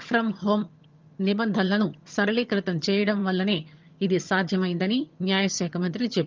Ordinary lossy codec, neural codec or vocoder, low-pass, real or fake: Opus, 24 kbps; vocoder, 22.05 kHz, 80 mel bands, HiFi-GAN; 7.2 kHz; fake